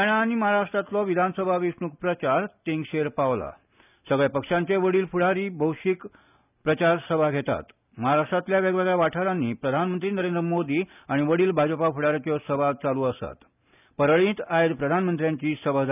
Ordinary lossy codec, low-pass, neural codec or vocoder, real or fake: none; 3.6 kHz; none; real